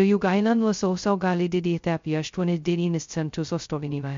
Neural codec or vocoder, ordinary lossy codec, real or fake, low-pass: codec, 16 kHz, 0.2 kbps, FocalCodec; MP3, 48 kbps; fake; 7.2 kHz